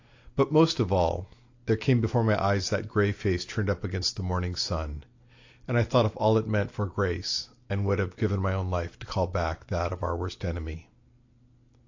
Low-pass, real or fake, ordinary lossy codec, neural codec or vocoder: 7.2 kHz; real; AAC, 48 kbps; none